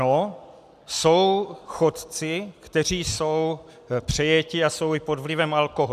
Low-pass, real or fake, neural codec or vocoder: 14.4 kHz; real; none